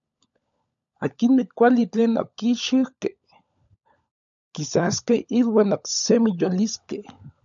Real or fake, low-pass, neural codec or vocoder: fake; 7.2 kHz; codec, 16 kHz, 16 kbps, FunCodec, trained on LibriTTS, 50 frames a second